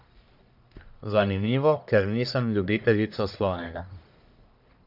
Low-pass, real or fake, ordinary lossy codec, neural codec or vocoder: 5.4 kHz; fake; none; codec, 44.1 kHz, 1.7 kbps, Pupu-Codec